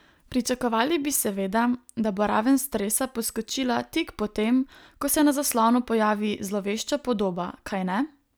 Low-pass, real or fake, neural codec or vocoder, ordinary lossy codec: none; real; none; none